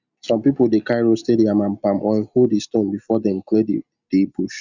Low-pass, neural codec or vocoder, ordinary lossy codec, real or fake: 7.2 kHz; none; Opus, 64 kbps; real